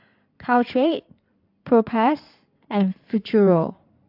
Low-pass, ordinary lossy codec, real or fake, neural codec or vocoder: 5.4 kHz; AAC, 48 kbps; fake; codec, 16 kHz in and 24 kHz out, 2.2 kbps, FireRedTTS-2 codec